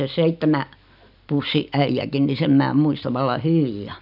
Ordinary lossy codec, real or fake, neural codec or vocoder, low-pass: none; real; none; 5.4 kHz